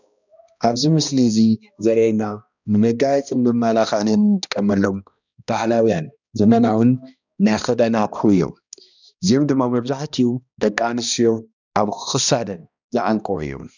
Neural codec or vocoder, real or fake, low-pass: codec, 16 kHz, 1 kbps, X-Codec, HuBERT features, trained on balanced general audio; fake; 7.2 kHz